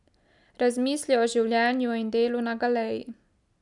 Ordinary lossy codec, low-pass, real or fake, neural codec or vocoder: none; 10.8 kHz; real; none